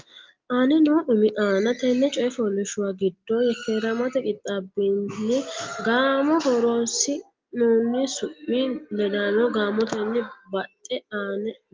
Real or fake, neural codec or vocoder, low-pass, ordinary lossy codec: real; none; 7.2 kHz; Opus, 32 kbps